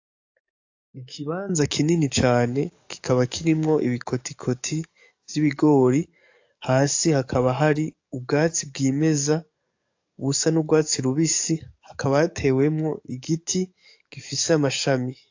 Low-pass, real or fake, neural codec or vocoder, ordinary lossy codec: 7.2 kHz; fake; codec, 44.1 kHz, 7.8 kbps, DAC; AAC, 48 kbps